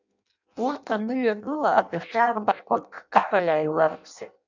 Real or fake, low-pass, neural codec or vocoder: fake; 7.2 kHz; codec, 16 kHz in and 24 kHz out, 0.6 kbps, FireRedTTS-2 codec